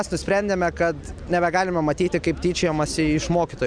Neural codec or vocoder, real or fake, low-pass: none; real; 9.9 kHz